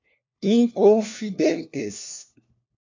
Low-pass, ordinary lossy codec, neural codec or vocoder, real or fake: 7.2 kHz; AAC, 48 kbps; codec, 16 kHz, 1 kbps, FunCodec, trained on LibriTTS, 50 frames a second; fake